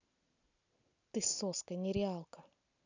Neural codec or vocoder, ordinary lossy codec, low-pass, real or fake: none; none; 7.2 kHz; real